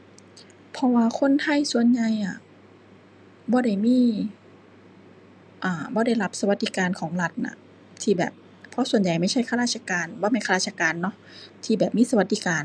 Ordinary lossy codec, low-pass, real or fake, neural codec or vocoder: none; none; real; none